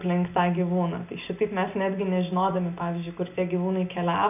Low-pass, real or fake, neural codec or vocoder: 3.6 kHz; real; none